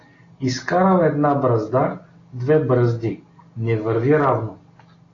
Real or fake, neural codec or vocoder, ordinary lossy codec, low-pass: real; none; AAC, 32 kbps; 7.2 kHz